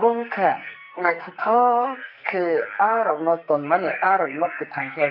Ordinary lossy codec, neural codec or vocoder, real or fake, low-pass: none; codec, 44.1 kHz, 2.6 kbps, SNAC; fake; 5.4 kHz